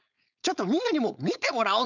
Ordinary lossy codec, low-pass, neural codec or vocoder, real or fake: none; 7.2 kHz; codec, 16 kHz, 4.8 kbps, FACodec; fake